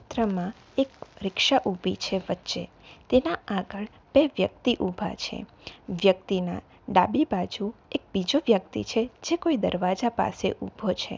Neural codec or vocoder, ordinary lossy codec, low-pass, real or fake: none; none; none; real